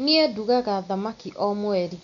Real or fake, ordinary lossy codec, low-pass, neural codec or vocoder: real; none; 7.2 kHz; none